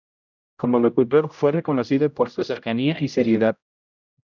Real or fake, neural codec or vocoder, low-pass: fake; codec, 16 kHz, 0.5 kbps, X-Codec, HuBERT features, trained on general audio; 7.2 kHz